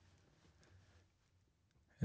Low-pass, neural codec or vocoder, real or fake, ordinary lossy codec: none; none; real; none